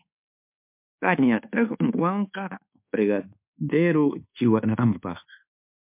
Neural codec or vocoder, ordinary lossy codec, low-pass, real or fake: codec, 24 kHz, 1.2 kbps, DualCodec; MP3, 32 kbps; 3.6 kHz; fake